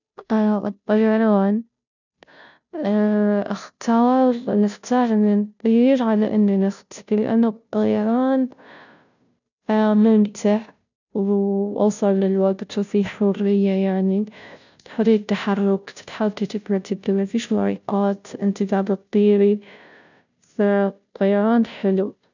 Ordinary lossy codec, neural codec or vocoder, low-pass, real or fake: none; codec, 16 kHz, 0.5 kbps, FunCodec, trained on Chinese and English, 25 frames a second; 7.2 kHz; fake